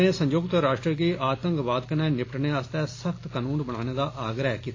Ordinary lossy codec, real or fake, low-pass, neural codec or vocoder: AAC, 32 kbps; real; 7.2 kHz; none